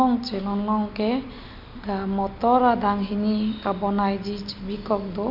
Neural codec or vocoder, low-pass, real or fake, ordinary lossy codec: none; 5.4 kHz; real; none